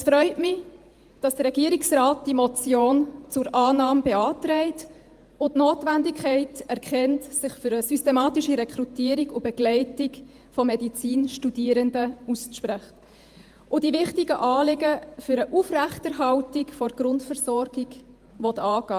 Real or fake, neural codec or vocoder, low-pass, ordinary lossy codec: fake; vocoder, 48 kHz, 128 mel bands, Vocos; 14.4 kHz; Opus, 32 kbps